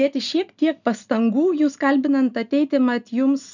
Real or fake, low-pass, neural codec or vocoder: real; 7.2 kHz; none